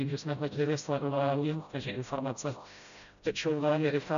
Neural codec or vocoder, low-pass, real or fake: codec, 16 kHz, 0.5 kbps, FreqCodec, smaller model; 7.2 kHz; fake